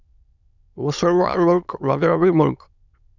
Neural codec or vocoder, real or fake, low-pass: autoencoder, 22.05 kHz, a latent of 192 numbers a frame, VITS, trained on many speakers; fake; 7.2 kHz